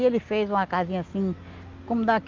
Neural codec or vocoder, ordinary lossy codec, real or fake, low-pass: none; Opus, 32 kbps; real; 7.2 kHz